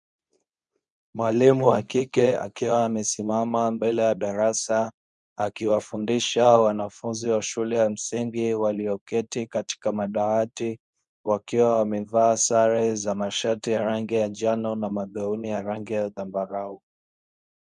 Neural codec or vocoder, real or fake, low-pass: codec, 24 kHz, 0.9 kbps, WavTokenizer, medium speech release version 2; fake; 10.8 kHz